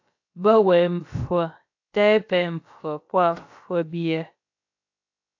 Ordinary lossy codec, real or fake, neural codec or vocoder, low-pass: AAC, 48 kbps; fake; codec, 16 kHz, about 1 kbps, DyCAST, with the encoder's durations; 7.2 kHz